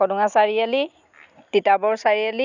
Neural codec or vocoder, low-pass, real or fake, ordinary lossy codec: none; 7.2 kHz; real; none